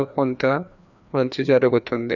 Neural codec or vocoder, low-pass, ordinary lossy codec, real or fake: codec, 16 kHz, 2 kbps, FreqCodec, larger model; 7.2 kHz; none; fake